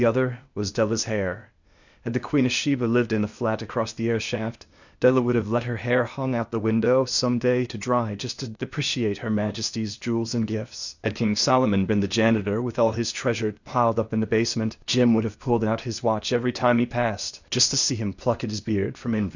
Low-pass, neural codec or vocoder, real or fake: 7.2 kHz; codec, 16 kHz, 0.8 kbps, ZipCodec; fake